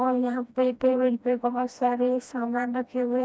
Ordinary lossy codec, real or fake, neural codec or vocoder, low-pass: none; fake; codec, 16 kHz, 1 kbps, FreqCodec, smaller model; none